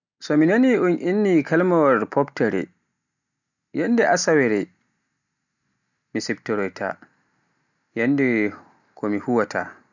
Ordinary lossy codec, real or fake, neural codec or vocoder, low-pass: none; real; none; 7.2 kHz